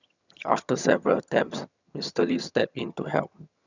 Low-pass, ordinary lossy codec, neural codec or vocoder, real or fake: 7.2 kHz; none; vocoder, 22.05 kHz, 80 mel bands, HiFi-GAN; fake